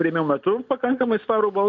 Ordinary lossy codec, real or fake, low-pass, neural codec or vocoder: MP3, 64 kbps; real; 7.2 kHz; none